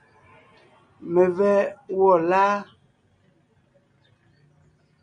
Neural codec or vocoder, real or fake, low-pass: none; real; 9.9 kHz